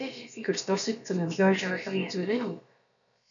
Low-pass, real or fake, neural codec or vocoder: 7.2 kHz; fake; codec, 16 kHz, about 1 kbps, DyCAST, with the encoder's durations